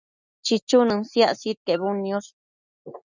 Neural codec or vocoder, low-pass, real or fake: none; 7.2 kHz; real